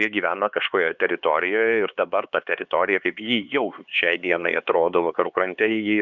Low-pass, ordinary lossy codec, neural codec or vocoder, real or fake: 7.2 kHz; Opus, 64 kbps; codec, 16 kHz, 4 kbps, X-Codec, HuBERT features, trained on LibriSpeech; fake